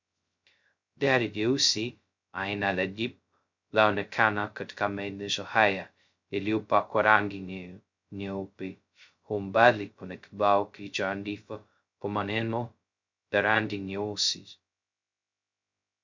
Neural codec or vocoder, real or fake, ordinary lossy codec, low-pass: codec, 16 kHz, 0.2 kbps, FocalCodec; fake; MP3, 64 kbps; 7.2 kHz